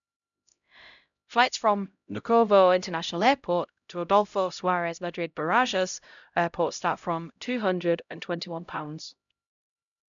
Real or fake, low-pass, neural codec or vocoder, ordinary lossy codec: fake; 7.2 kHz; codec, 16 kHz, 0.5 kbps, X-Codec, HuBERT features, trained on LibriSpeech; none